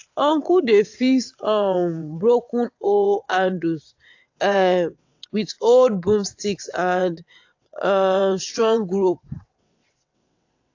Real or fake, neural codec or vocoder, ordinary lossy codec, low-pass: fake; vocoder, 22.05 kHz, 80 mel bands, WaveNeXt; AAC, 48 kbps; 7.2 kHz